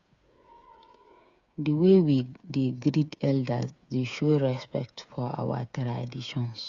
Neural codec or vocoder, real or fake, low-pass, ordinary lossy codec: codec, 16 kHz, 8 kbps, FreqCodec, smaller model; fake; 7.2 kHz; Opus, 64 kbps